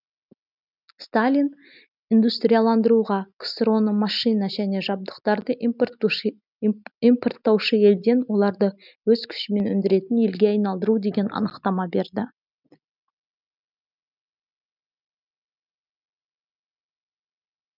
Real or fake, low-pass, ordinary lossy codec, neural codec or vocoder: real; 5.4 kHz; none; none